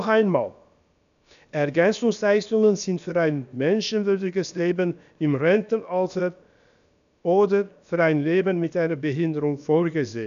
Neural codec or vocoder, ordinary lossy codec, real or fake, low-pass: codec, 16 kHz, about 1 kbps, DyCAST, with the encoder's durations; none; fake; 7.2 kHz